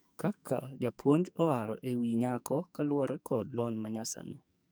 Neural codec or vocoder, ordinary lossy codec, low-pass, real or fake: codec, 44.1 kHz, 2.6 kbps, SNAC; none; none; fake